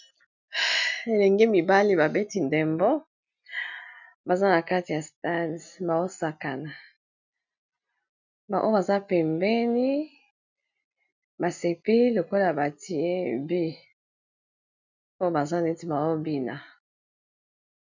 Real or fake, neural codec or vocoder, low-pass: real; none; 7.2 kHz